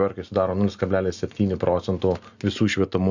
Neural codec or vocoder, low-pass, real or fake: none; 7.2 kHz; real